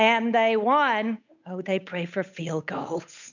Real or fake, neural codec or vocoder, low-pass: fake; codec, 16 kHz, 8 kbps, FunCodec, trained on Chinese and English, 25 frames a second; 7.2 kHz